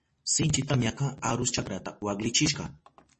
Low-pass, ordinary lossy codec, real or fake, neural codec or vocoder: 10.8 kHz; MP3, 32 kbps; real; none